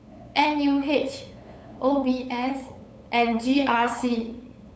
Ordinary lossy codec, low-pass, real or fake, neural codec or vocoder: none; none; fake; codec, 16 kHz, 8 kbps, FunCodec, trained on LibriTTS, 25 frames a second